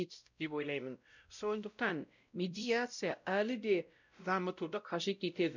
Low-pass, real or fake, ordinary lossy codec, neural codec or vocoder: 7.2 kHz; fake; none; codec, 16 kHz, 0.5 kbps, X-Codec, WavLM features, trained on Multilingual LibriSpeech